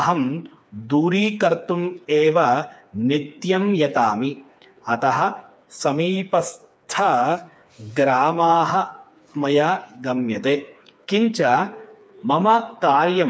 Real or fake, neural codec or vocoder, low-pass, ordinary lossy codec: fake; codec, 16 kHz, 4 kbps, FreqCodec, smaller model; none; none